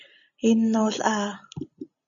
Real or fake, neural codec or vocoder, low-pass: real; none; 7.2 kHz